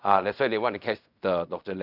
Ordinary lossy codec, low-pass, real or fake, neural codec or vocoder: none; 5.4 kHz; fake; codec, 16 kHz in and 24 kHz out, 0.4 kbps, LongCat-Audio-Codec, fine tuned four codebook decoder